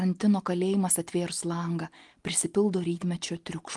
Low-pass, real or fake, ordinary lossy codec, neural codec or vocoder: 10.8 kHz; real; Opus, 24 kbps; none